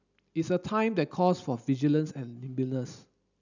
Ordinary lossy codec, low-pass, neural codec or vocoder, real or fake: none; 7.2 kHz; none; real